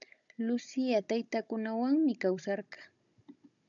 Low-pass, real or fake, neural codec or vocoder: 7.2 kHz; fake; codec, 16 kHz, 16 kbps, FunCodec, trained on Chinese and English, 50 frames a second